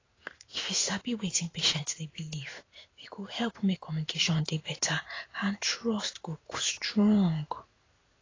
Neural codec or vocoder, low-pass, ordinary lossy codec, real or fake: codec, 16 kHz in and 24 kHz out, 1 kbps, XY-Tokenizer; 7.2 kHz; AAC, 32 kbps; fake